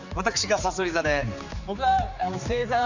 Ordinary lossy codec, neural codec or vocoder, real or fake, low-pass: none; codec, 16 kHz, 4 kbps, X-Codec, HuBERT features, trained on general audio; fake; 7.2 kHz